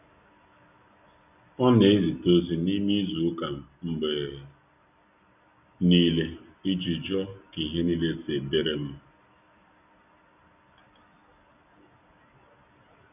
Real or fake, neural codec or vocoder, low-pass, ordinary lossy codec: real; none; 3.6 kHz; none